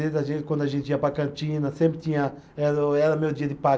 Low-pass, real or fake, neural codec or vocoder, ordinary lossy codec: none; real; none; none